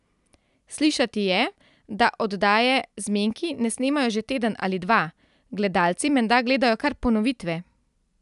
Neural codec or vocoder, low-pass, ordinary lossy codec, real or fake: none; 10.8 kHz; none; real